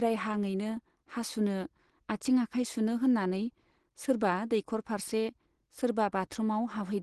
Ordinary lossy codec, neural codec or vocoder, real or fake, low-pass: Opus, 16 kbps; none; real; 10.8 kHz